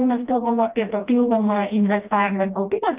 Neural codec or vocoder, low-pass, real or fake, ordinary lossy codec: codec, 16 kHz, 1 kbps, FreqCodec, smaller model; 3.6 kHz; fake; Opus, 24 kbps